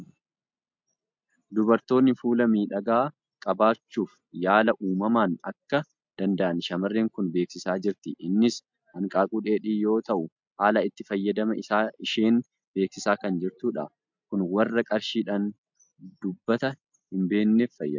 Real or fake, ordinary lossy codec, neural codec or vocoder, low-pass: real; MP3, 64 kbps; none; 7.2 kHz